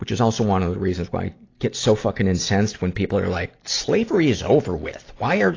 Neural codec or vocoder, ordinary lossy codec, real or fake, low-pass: none; AAC, 32 kbps; real; 7.2 kHz